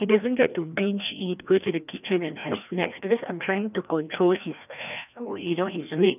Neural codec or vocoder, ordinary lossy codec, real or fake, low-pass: codec, 16 kHz, 1 kbps, FreqCodec, larger model; none; fake; 3.6 kHz